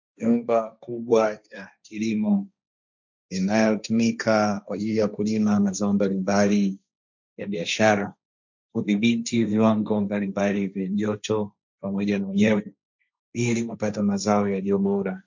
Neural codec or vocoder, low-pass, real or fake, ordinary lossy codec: codec, 16 kHz, 1.1 kbps, Voila-Tokenizer; 7.2 kHz; fake; MP3, 64 kbps